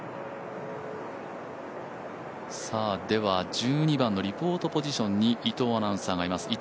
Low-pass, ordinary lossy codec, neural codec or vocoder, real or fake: none; none; none; real